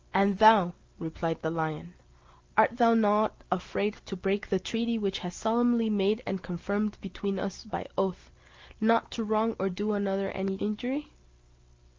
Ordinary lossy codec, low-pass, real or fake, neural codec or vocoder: Opus, 24 kbps; 7.2 kHz; real; none